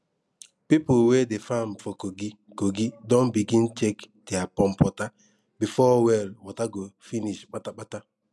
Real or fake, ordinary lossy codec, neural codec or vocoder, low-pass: real; none; none; none